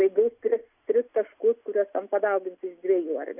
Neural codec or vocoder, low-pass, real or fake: none; 3.6 kHz; real